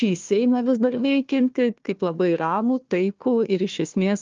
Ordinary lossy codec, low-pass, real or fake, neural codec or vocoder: Opus, 24 kbps; 7.2 kHz; fake; codec, 16 kHz, 1 kbps, FunCodec, trained on Chinese and English, 50 frames a second